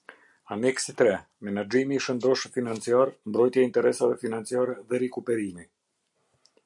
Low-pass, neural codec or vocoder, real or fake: 10.8 kHz; none; real